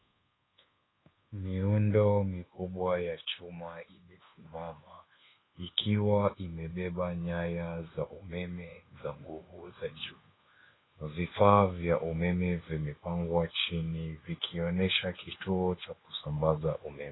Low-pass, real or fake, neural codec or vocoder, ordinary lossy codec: 7.2 kHz; fake; codec, 24 kHz, 1.2 kbps, DualCodec; AAC, 16 kbps